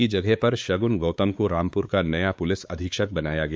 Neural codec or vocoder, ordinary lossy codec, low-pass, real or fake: codec, 16 kHz, 4 kbps, X-Codec, WavLM features, trained on Multilingual LibriSpeech; none; none; fake